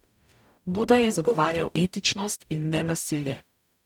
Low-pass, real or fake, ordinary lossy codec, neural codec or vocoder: 19.8 kHz; fake; none; codec, 44.1 kHz, 0.9 kbps, DAC